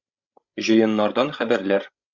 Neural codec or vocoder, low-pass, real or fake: codec, 16 kHz, 16 kbps, FreqCodec, larger model; 7.2 kHz; fake